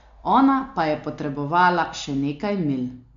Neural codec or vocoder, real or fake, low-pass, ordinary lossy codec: none; real; 7.2 kHz; AAC, 64 kbps